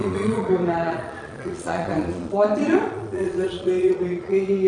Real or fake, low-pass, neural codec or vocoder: fake; 9.9 kHz; vocoder, 22.05 kHz, 80 mel bands, Vocos